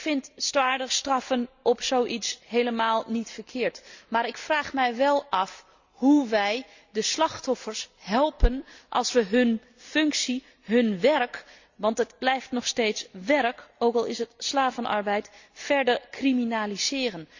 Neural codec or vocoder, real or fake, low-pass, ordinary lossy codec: none; real; 7.2 kHz; Opus, 64 kbps